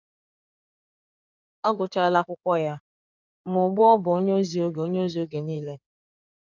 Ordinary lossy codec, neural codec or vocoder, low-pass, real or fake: none; codec, 16 kHz in and 24 kHz out, 2.2 kbps, FireRedTTS-2 codec; 7.2 kHz; fake